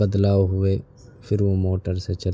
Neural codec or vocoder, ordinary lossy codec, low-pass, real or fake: none; none; none; real